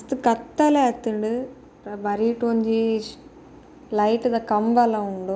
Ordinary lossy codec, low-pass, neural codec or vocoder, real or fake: none; none; none; real